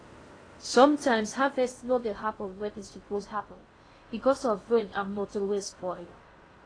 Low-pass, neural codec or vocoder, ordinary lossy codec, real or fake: 9.9 kHz; codec, 16 kHz in and 24 kHz out, 0.6 kbps, FocalCodec, streaming, 2048 codes; AAC, 32 kbps; fake